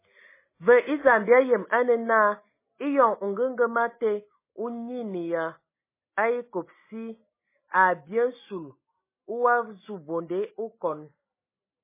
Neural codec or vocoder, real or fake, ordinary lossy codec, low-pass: none; real; MP3, 16 kbps; 3.6 kHz